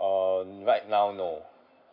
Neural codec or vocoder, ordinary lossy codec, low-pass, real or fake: none; none; 5.4 kHz; real